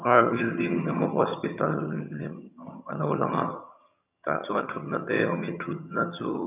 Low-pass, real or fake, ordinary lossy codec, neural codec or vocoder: 3.6 kHz; fake; none; vocoder, 22.05 kHz, 80 mel bands, HiFi-GAN